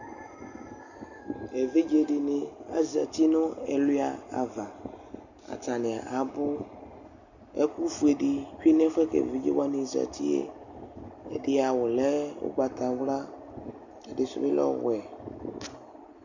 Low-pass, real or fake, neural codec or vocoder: 7.2 kHz; real; none